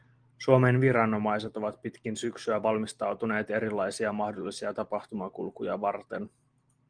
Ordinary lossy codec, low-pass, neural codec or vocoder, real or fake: Opus, 24 kbps; 9.9 kHz; none; real